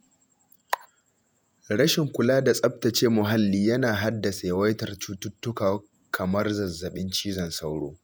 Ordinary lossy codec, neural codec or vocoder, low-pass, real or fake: none; vocoder, 48 kHz, 128 mel bands, Vocos; none; fake